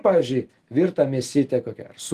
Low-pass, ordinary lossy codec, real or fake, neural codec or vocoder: 14.4 kHz; Opus, 24 kbps; real; none